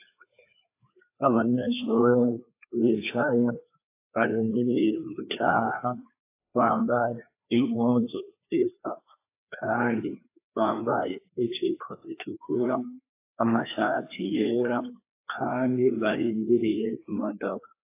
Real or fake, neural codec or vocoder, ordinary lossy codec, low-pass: fake; codec, 16 kHz, 2 kbps, FreqCodec, larger model; AAC, 24 kbps; 3.6 kHz